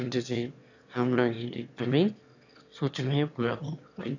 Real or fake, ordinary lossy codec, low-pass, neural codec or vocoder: fake; none; 7.2 kHz; autoencoder, 22.05 kHz, a latent of 192 numbers a frame, VITS, trained on one speaker